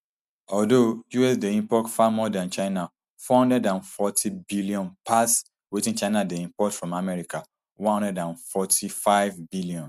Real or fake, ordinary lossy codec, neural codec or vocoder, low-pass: real; MP3, 96 kbps; none; 14.4 kHz